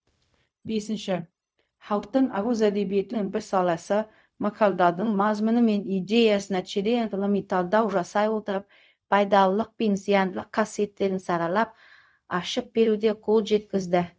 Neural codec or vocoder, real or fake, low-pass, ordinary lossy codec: codec, 16 kHz, 0.4 kbps, LongCat-Audio-Codec; fake; none; none